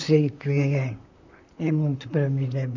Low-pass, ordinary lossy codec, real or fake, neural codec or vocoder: 7.2 kHz; none; fake; vocoder, 44.1 kHz, 128 mel bands, Pupu-Vocoder